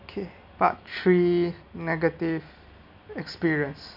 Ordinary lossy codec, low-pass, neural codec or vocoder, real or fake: none; 5.4 kHz; none; real